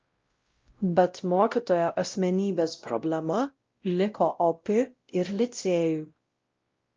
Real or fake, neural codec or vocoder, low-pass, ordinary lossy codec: fake; codec, 16 kHz, 0.5 kbps, X-Codec, WavLM features, trained on Multilingual LibriSpeech; 7.2 kHz; Opus, 24 kbps